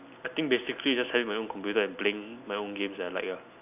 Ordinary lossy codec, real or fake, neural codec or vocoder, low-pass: none; real; none; 3.6 kHz